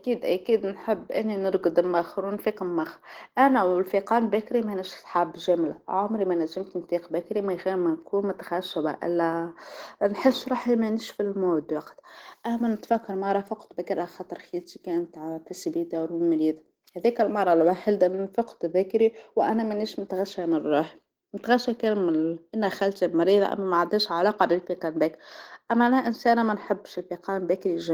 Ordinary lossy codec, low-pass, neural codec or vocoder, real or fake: Opus, 16 kbps; 19.8 kHz; none; real